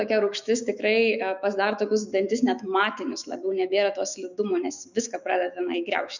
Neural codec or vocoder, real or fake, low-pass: autoencoder, 48 kHz, 128 numbers a frame, DAC-VAE, trained on Japanese speech; fake; 7.2 kHz